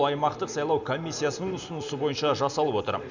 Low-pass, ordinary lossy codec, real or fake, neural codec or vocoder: 7.2 kHz; none; real; none